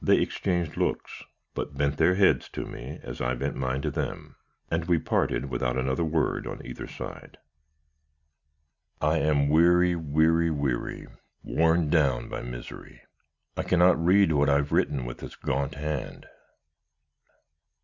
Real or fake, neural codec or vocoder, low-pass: real; none; 7.2 kHz